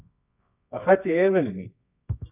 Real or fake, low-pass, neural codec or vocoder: fake; 3.6 kHz; codec, 24 kHz, 0.9 kbps, WavTokenizer, medium music audio release